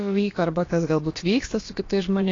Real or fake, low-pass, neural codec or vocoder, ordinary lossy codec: fake; 7.2 kHz; codec, 16 kHz, about 1 kbps, DyCAST, with the encoder's durations; AAC, 32 kbps